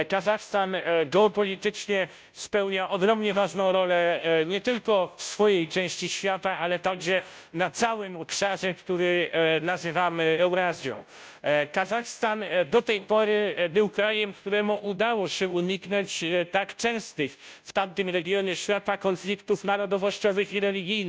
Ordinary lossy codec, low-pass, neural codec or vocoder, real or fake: none; none; codec, 16 kHz, 0.5 kbps, FunCodec, trained on Chinese and English, 25 frames a second; fake